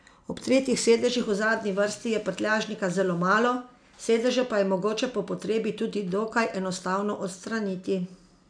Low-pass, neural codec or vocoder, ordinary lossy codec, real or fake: 9.9 kHz; none; none; real